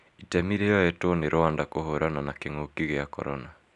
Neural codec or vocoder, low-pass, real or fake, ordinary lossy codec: none; 10.8 kHz; real; none